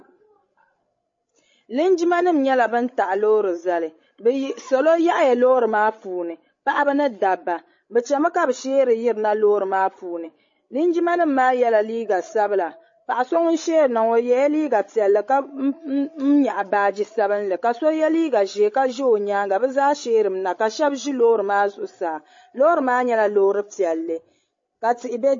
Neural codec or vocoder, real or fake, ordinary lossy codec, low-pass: codec, 16 kHz, 16 kbps, FreqCodec, larger model; fake; MP3, 32 kbps; 7.2 kHz